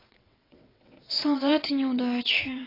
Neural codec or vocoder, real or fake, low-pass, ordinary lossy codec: vocoder, 22.05 kHz, 80 mel bands, WaveNeXt; fake; 5.4 kHz; AAC, 24 kbps